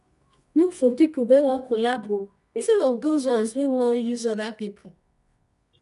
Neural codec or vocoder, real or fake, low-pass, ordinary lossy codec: codec, 24 kHz, 0.9 kbps, WavTokenizer, medium music audio release; fake; 10.8 kHz; none